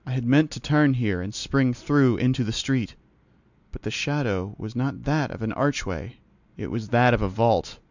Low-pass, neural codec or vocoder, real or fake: 7.2 kHz; none; real